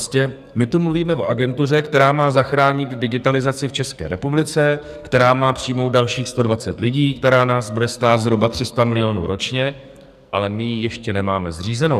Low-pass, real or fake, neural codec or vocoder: 14.4 kHz; fake; codec, 44.1 kHz, 2.6 kbps, SNAC